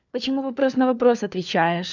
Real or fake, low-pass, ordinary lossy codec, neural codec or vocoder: fake; 7.2 kHz; none; codec, 16 kHz, 4 kbps, FunCodec, trained on LibriTTS, 50 frames a second